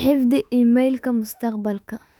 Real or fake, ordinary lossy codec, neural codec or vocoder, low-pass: fake; none; autoencoder, 48 kHz, 32 numbers a frame, DAC-VAE, trained on Japanese speech; 19.8 kHz